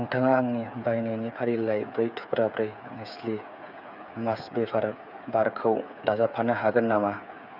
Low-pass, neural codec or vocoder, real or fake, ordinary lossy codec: 5.4 kHz; codec, 16 kHz, 8 kbps, FreqCodec, smaller model; fake; none